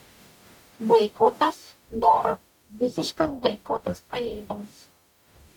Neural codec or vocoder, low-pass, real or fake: codec, 44.1 kHz, 0.9 kbps, DAC; 19.8 kHz; fake